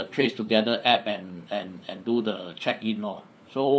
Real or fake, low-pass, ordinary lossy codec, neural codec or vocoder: fake; none; none; codec, 16 kHz, 4 kbps, FunCodec, trained on LibriTTS, 50 frames a second